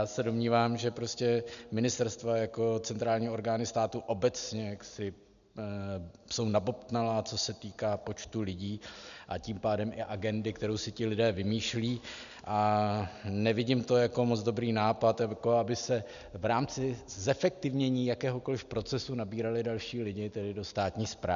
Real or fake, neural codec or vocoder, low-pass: real; none; 7.2 kHz